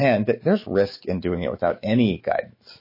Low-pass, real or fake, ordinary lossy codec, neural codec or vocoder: 5.4 kHz; fake; MP3, 24 kbps; autoencoder, 48 kHz, 128 numbers a frame, DAC-VAE, trained on Japanese speech